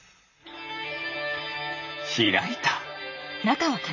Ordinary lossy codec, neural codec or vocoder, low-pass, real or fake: none; vocoder, 44.1 kHz, 128 mel bands, Pupu-Vocoder; 7.2 kHz; fake